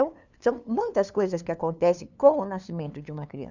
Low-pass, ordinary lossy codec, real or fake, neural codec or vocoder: 7.2 kHz; none; fake; codec, 16 kHz, 2 kbps, FunCodec, trained on LibriTTS, 25 frames a second